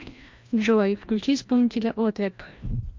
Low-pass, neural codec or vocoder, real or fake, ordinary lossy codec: 7.2 kHz; codec, 16 kHz, 1 kbps, FreqCodec, larger model; fake; MP3, 48 kbps